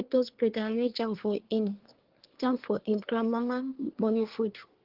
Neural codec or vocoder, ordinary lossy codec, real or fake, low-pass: codec, 24 kHz, 1 kbps, SNAC; Opus, 16 kbps; fake; 5.4 kHz